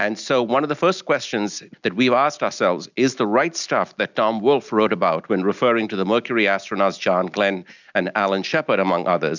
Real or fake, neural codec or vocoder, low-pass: real; none; 7.2 kHz